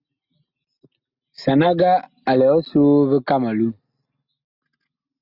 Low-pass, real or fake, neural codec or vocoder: 5.4 kHz; real; none